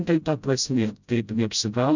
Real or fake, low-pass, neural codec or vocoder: fake; 7.2 kHz; codec, 16 kHz, 0.5 kbps, FreqCodec, smaller model